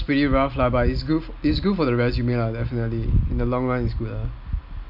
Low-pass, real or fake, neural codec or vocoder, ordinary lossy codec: 5.4 kHz; real; none; MP3, 48 kbps